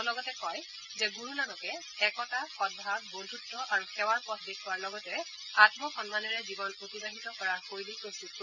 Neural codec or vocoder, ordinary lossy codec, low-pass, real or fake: none; none; 7.2 kHz; real